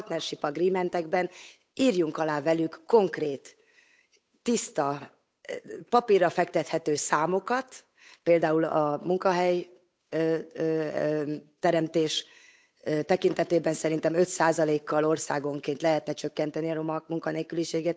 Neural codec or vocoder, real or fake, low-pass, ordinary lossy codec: codec, 16 kHz, 8 kbps, FunCodec, trained on Chinese and English, 25 frames a second; fake; none; none